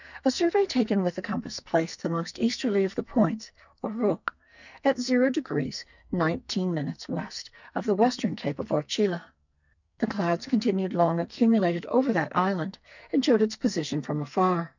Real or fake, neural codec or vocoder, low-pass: fake; codec, 44.1 kHz, 2.6 kbps, SNAC; 7.2 kHz